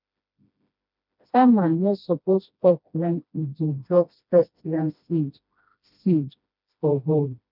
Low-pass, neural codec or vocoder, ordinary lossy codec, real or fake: 5.4 kHz; codec, 16 kHz, 1 kbps, FreqCodec, smaller model; none; fake